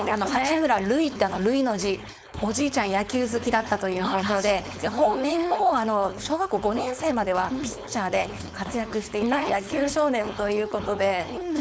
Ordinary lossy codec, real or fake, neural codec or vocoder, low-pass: none; fake; codec, 16 kHz, 4.8 kbps, FACodec; none